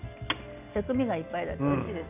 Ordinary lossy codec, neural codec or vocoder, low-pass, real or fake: none; none; 3.6 kHz; real